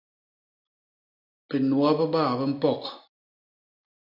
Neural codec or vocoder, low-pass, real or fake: none; 5.4 kHz; real